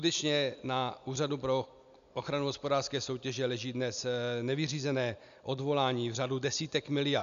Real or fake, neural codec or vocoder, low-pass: real; none; 7.2 kHz